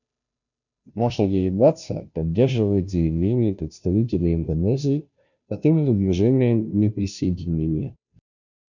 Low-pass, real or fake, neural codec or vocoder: 7.2 kHz; fake; codec, 16 kHz, 0.5 kbps, FunCodec, trained on Chinese and English, 25 frames a second